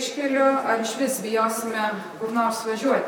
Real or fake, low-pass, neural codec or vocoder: fake; 19.8 kHz; vocoder, 44.1 kHz, 128 mel bands, Pupu-Vocoder